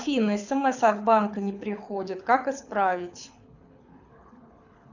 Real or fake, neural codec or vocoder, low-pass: fake; codec, 24 kHz, 6 kbps, HILCodec; 7.2 kHz